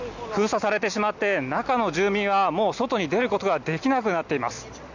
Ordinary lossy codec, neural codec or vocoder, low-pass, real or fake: none; none; 7.2 kHz; real